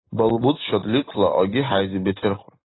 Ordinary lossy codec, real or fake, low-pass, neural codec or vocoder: AAC, 16 kbps; real; 7.2 kHz; none